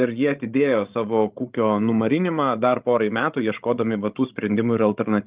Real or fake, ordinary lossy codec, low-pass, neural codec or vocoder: fake; Opus, 32 kbps; 3.6 kHz; codec, 16 kHz, 16 kbps, FreqCodec, larger model